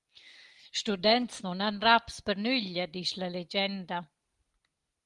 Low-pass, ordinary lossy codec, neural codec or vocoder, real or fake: 10.8 kHz; Opus, 24 kbps; none; real